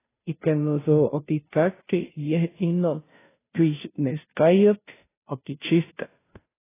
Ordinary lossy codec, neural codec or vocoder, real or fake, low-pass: AAC, 16 kbps; codec, 16 kHz, 0.5 kbps, FunCodec, trained on Chinese and English, 25 frames a second; fake; 3.6 kHz